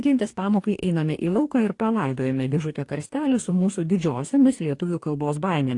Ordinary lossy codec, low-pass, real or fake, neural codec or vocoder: AAC, 48 kbps; 10.8 kHz; fake; codec, 44.1 kHz, 2.6 kbps, DAC